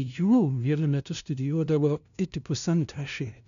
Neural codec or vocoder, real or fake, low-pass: codec, 16 kHz, 0.5 kbps, FunCodec, trained on LibriTTS, 25 frames a second; fake; 7.2 kHz